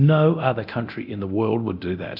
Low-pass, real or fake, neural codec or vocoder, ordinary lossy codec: 5.4 kHz; fake; codec, 24 kHz, 0.9 kbps, DualCodec; AAC, 48 kbps